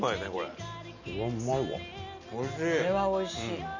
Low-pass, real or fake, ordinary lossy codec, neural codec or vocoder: 7.2 kHz; real; none; none